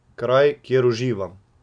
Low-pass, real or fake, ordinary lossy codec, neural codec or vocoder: 9.9 kHz; real; none; none